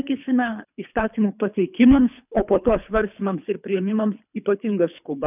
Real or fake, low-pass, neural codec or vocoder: fake; 3.6 kHz; codec, 24 kHz, 3 kbps, HILCodec